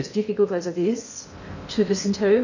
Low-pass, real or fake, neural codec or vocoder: 7.2 kHz; fake; codec, 16 kHz in and 24 kHz out, 0.6 kbps, FocalCodec, streaming, 2048 codes